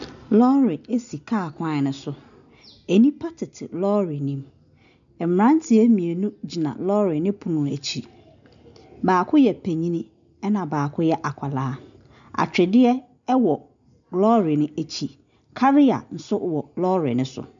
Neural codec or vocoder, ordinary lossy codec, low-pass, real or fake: none; MP3, 96 kbps; 7.2 kHz; real